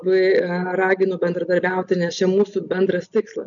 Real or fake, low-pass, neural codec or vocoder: real; 7.2 kHz; none